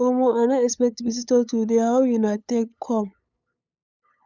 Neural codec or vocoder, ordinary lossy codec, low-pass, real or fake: codec, 16 kHz, 8 kbps, FunCodec, trained on LibriTTS, 25 frames a second; none; 7.2 kHz; fake